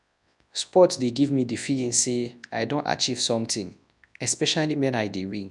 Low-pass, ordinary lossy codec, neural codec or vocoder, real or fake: 10.8 kHz; none; codec, 24 kHz, 0.9 kbps, WavTokenizer, large speech release; fake